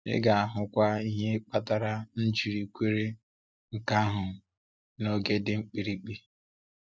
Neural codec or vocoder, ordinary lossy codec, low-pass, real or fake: none; none; none; real